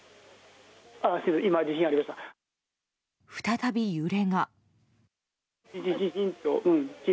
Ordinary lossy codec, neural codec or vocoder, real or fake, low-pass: none; none; real; none